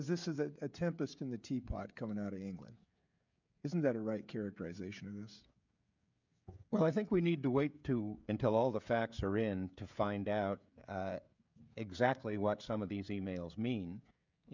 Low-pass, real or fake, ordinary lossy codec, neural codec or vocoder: 7.2 kHz; fake; MP3, 64 kbps; codec, 16 kHz, 16 kbps, FreqCodec, smaller model